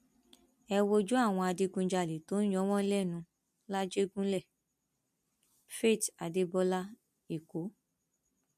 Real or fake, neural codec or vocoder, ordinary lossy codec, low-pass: real; none; MP3, 64 kbps; 14.4 kHz